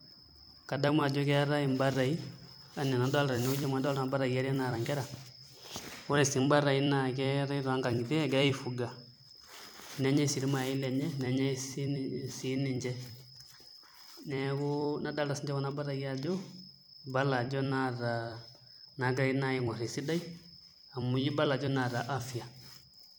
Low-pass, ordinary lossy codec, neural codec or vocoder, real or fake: none; none; vocoder, 44.1 kHz, 128 mel bands every 256 samples, BigVGAN v2; fake